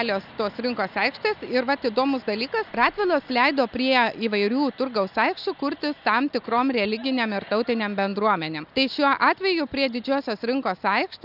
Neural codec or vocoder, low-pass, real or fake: none; 5.4 kHz; real